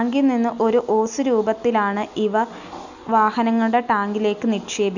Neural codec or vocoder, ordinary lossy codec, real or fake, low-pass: none; none; real; 7.2 kHz